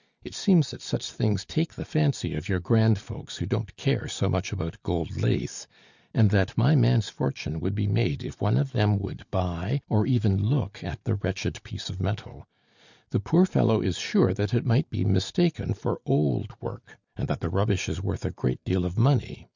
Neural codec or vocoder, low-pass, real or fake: none; 7.2 kHz; real